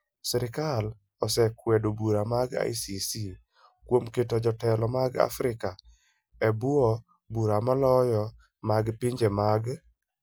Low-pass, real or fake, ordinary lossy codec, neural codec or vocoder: none; real; none; none